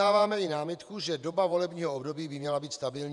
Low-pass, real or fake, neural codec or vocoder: 10.8 kHz; fake; vocoder, 48 kHz, 128 mel bands, Vocos